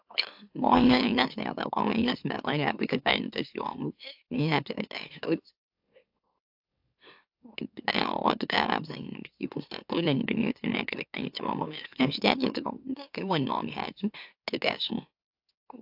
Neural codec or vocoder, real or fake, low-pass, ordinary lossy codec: autoencoder, 44.1 kHz, a latent of 192 numbers a frame, MeloTTS; fake; 5.4 kHz; AAC, 48 kbps